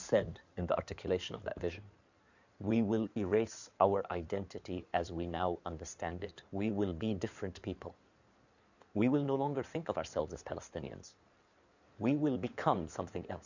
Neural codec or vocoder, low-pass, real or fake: codec, 16 kHz in and 24 kHz out, 2.2 kbps, FireRedTTS-2 codec; 7.2 kHz; fake